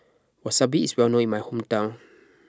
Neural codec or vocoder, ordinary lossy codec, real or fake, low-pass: none; none; real; none